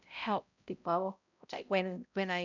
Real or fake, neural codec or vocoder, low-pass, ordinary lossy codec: fake; codec, 16 kHz, 0.5 kbps, X-Codec, HuBERT features, trained on balanced general audio; 7.2 kHz; none